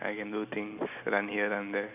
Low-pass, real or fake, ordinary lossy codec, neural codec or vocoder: 3.6 kHz; real; none; none